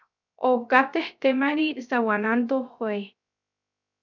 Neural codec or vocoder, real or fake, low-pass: codec, 16 kHz, 0.3 kbps, FocalCodec; fake; 7.2 kHz